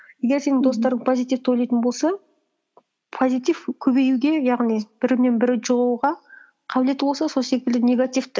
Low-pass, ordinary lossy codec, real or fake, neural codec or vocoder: none; none; real; none